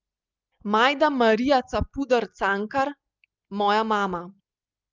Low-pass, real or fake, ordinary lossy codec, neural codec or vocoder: 7.2 kHz; real; Opus, 24 kbps; none